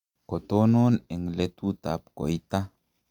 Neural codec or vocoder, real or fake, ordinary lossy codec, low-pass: none; real; Opus, 64 kbps; 19.8 kHz